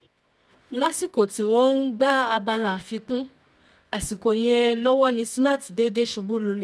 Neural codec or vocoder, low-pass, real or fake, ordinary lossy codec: codec, 24 kHz, 0.9 kbps, WavTokenizer, medium music audio release; none; fake; none